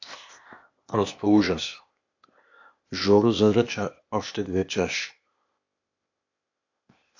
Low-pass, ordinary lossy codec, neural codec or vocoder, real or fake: 7.2 kHz; AAC, 48 kbps; codec, 16 kHz, 0.8 kbps, ZipCodec; fake